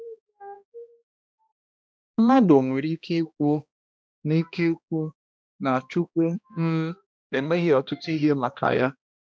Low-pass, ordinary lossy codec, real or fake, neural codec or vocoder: none; none; fake; codec, 16 kHz, 1 kbps, X-Codec, HuBERT features, trained on balanced general audio